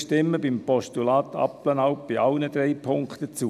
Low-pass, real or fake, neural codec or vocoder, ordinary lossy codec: 14.4 kHz; real; none; none